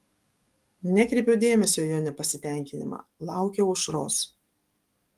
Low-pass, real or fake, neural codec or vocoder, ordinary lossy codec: 14.4 kHz; fake; codec, 44.1 kHz, 7.8 kbps, DAC; Opus, 32 kbps